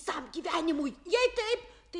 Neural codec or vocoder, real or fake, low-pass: none; real; 10.8 kHz